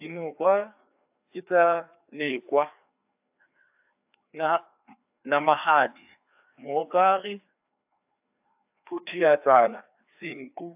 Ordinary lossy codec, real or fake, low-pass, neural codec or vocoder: AAC, 32 kbps; fake; 3.6 kHz; codec, 16 kHz, 2 kbps, FreqCodec, larger model